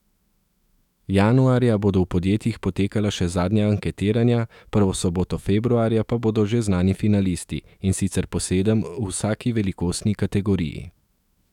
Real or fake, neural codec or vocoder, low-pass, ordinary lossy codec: fake; autoencoder, 48 kHz, 128 numbers a frame, DAC-VAE, trained on Japanese speech; 19.8 kHz; none